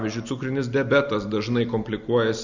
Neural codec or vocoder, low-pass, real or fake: none; 7.2 kHz; real